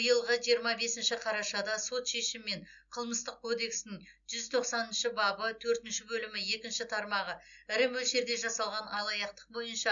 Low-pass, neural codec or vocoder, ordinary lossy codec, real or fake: 7.2 kHz; none; none; real